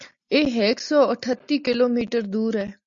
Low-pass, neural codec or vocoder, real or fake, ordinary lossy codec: 7.2 kHz; none; real; MP3, 96 kbps